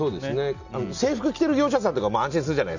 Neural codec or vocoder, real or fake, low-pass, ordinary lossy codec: none; real; 7.2 kHz; none